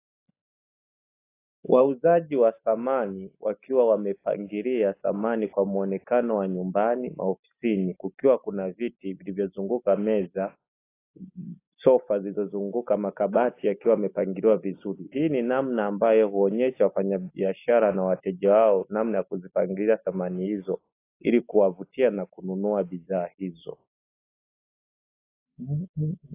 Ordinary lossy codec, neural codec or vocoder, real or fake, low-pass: AAC, 24 kbps; none; real; 3.6 kHz